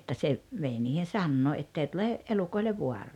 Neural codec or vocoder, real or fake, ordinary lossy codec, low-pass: none; real; none; 19.8 kHz